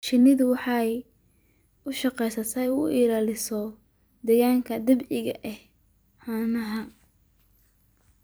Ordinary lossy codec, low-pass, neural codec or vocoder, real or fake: none; none; vocoder, 44.1 kHz, 128 mel bands every 256 samples, BigVGAN v2; fake